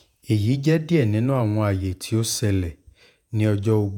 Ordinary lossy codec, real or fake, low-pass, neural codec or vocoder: none; fake; 19.8 kHz; vocoder, 48 kHz, 128 mel bands, Vocos